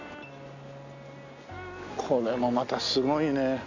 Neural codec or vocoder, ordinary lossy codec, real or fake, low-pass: none; none; real; 7.2 kHz